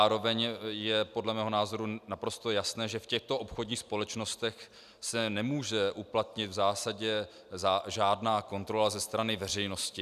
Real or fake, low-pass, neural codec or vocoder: real; 14.4 kHz; none